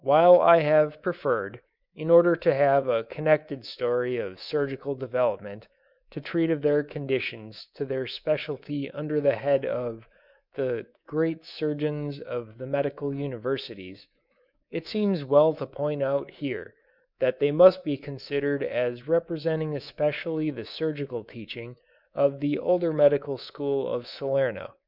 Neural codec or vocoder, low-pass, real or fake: codec, 24 kHz, 3.1 kbps, DualCodec; 5.4 kHz; fake